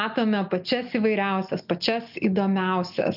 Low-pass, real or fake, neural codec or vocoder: 5.4 kHz; real; none